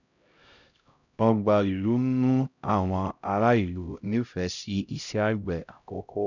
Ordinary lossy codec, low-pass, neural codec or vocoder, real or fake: none; 7.2 kHz; codec, 16 kHz, 0.5 kbps, X-Codec, HuBERT features, trained on LibriSpeech; fake